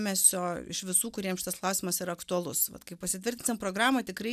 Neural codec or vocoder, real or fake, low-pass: none; real; 14.4 kHz